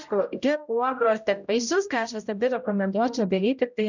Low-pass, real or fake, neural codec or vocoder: 7.2 kHz; fake; codec, 16 kHz, 0.5 kbps, X-Codec, HuBERT features, trained on general audio